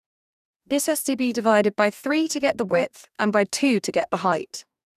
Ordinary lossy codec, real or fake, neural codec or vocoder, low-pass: none; fake; codec, 44.1 kHz, 2.6 kbps, DAC; 14.4 kHz